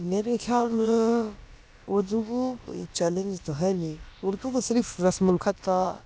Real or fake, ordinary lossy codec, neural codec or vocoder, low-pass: fake; none; codec, 16 kHz, about 1 kbps, DyCAST, with the encoder's durations; none